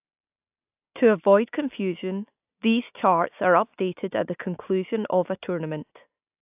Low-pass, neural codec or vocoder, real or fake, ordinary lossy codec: 3.6 kHz; none; real; AAC, 32 kbps